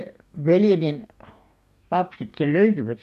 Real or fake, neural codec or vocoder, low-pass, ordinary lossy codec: fake; codec, 44.1 kHz, 2.6 kbps, DAC; 14.4 kHz; MP3, 96 kbps